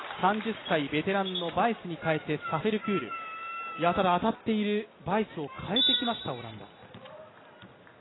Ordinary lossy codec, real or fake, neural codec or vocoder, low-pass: AAC, 16 kbps; real; none; 7.2 kHz